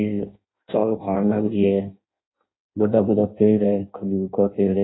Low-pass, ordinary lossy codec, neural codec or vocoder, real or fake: 7.2 kHz; AAC, 16 kbps; codec, 16 kHz in and 24 kHz out, 0.6 kbps, FireRedTTS-2 codec; fake